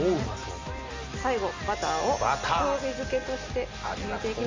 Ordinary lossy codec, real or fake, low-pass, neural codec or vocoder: MP3, 32 kbps; real; 7.2 kHz; none